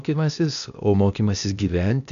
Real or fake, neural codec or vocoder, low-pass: fake; codec, 16 kHz, 0.8 kbps, ZipCodec; 7.2 kHz